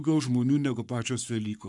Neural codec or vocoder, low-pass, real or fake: codec, 44.1 kHz, 7.8 kbps, Pupu-Codec; 10.8 kHz; fake